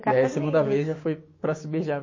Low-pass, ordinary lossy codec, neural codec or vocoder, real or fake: 7.2 kHz; MP3, 32 kbps; codec, 16 kHz, 6 kbps, DAC; fake